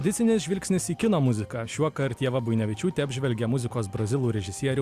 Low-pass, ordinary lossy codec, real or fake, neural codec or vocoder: 14.4 kHz; Opus, 64 kbps; real; none